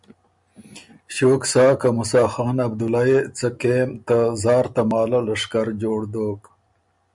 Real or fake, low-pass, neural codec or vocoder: real; 10.8 kHz; none